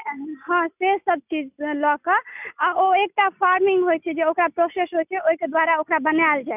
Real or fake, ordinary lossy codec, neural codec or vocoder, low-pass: real; none; none; 3.6 kHz